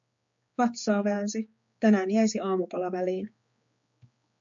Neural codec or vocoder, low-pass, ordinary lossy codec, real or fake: codec, 16 kHz, 4 kbps, X-Codec, HuBERT features, trained on general audio; 7.2 kHz; MP3, 48 kbps; fake